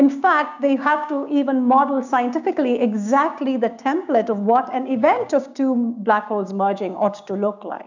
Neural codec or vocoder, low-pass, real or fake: codec, 16 kHz, 6 kbps, DAC; 7.2 kHz; fake